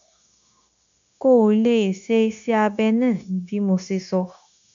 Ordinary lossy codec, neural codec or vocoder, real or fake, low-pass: none; codec, 16 kHz, 0.9 kbps, LongCat-Audio-Codec; fake; 7.2 kHz